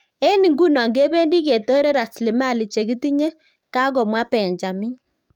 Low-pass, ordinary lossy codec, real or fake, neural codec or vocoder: 19.8 kHz; none; fake; codec, 44.1 kHz, 7.8 kbps, DAC